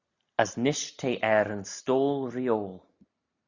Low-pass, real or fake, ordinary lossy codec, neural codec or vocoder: 7.2 kHz; real; Opus, 64 kbps; none